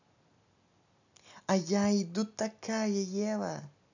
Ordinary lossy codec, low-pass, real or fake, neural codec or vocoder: none; 7.2 kHz; real; none